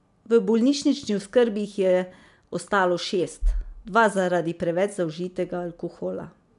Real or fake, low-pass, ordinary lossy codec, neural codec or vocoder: real; 10.8 kHz; none; none